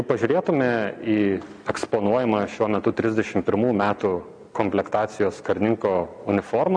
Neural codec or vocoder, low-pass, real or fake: none; 9.9 kHz; real